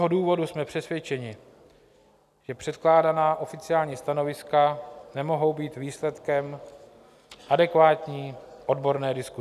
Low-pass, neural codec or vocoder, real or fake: 14.4 kHz; none; real